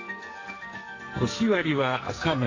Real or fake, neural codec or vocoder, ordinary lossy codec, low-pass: fake; codec, 44.1 kHz, 2.6 kbps, SNAC; AAC, 32 kbps; 7.2 kHz